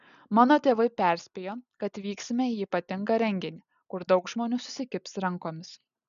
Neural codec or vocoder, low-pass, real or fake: none; 7.2 kHz; real